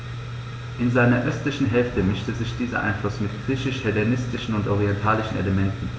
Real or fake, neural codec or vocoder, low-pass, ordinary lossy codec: real; none; none; none